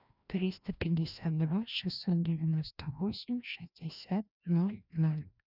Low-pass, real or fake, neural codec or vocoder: 5.4 kHz; fake; codec, 16 kHz, 1 kbps, FreqCodec, larger model